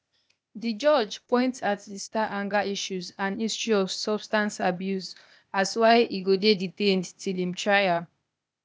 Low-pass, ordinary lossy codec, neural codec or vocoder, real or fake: none; none; codec, 16 kHz, 0.8 kbps, ZipCodec; fake